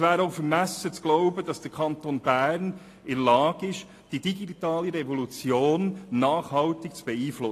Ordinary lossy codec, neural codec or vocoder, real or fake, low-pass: AAC, 48 kbps; none; real; 14.4 kHz